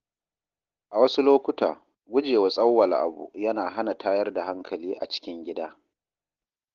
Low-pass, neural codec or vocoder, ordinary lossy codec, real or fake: 7.2 kHz; none; Opus, 16 kbps; real